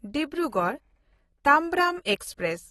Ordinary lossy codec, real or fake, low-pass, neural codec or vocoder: AAC, 32 kbps; real; 19.8 kHz; none